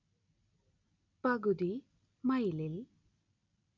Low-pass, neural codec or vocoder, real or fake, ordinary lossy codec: 7.2 kHz; none; real; none